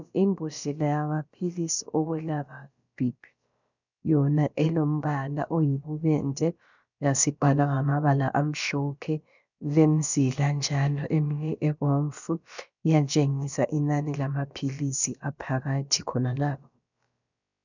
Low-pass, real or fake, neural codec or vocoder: 7.2 kHz; fake; codec, 16 kHz, about 1 kbps, DyCAST, with the encoder's durations